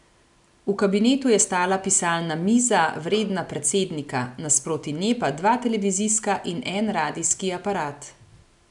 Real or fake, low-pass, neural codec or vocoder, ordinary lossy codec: real; 10.8 kHz; none; none